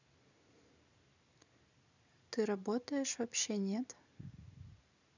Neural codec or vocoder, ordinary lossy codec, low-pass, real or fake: none; none; 7.2 kHz; real